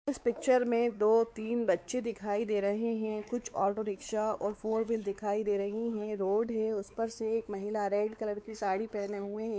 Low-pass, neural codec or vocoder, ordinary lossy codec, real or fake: none; codec, 16 kHz, 4 kbps, X-Codec, WavLM features, trained on Multilingual LibriSpeech; none; fake